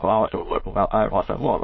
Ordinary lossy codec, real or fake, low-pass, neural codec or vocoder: MP3, 24 kbps; fake; 7.2 kHz; autoencoder, 22.05 kHz, a latent of 192 numbers a frame, VITS, trained on many speakers